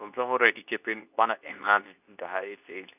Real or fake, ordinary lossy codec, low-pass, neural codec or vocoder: fake; none; 3.6 kHz; codec, 24 kHz, 0.9 kbps, WavTokenizer, medium speech release version 2